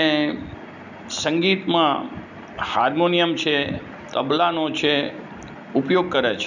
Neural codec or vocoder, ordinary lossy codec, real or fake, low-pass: none; none; real; 7.2 kHz